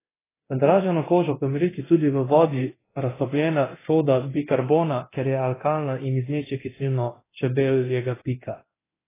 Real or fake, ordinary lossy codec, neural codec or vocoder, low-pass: fake; AAC, 16 kbps; codec, 24 kHz, 0.9 kbps, DualCodec; 3.6 kHz